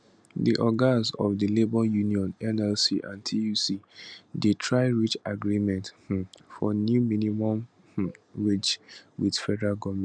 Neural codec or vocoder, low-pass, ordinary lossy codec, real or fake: none; 9.9 kHz; none; real